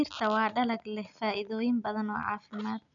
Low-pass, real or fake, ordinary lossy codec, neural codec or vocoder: 7.2 kHz; real; none; none